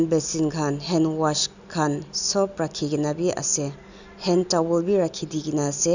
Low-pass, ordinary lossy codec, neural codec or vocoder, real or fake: 7.2 kHz; none; none; real